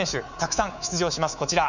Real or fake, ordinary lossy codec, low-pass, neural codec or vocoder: fake; none; 7.2 kHz; codec, 24 kHz, 3.1 kbps, DualCodec